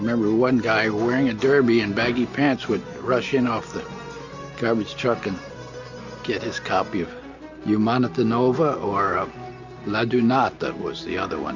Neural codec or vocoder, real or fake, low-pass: none; real; 7.2 kHz